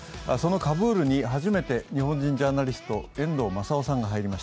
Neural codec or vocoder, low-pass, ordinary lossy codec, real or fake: none; none; none; real